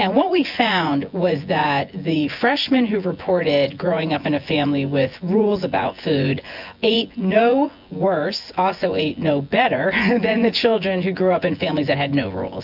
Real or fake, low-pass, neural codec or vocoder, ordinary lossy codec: fake; 5.4 kHz; vocoder, 24 kHz, 100 mel bands, Vocos; MP3, 48 kbps